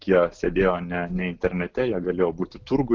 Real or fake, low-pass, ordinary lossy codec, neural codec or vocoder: real; 7.2 kHz; Opus, 16 kbps; none